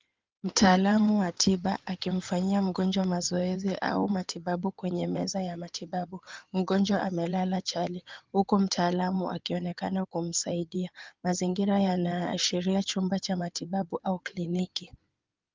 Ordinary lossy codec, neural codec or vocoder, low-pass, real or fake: Opus, 24 kbps; codec, 16 kHz in and 24 kHz out, 2.2 kbps, FireRedTTS-2 codec; 7.2 kHz; fake